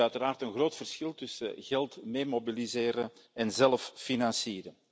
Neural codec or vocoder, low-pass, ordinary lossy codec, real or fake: none; none; none; real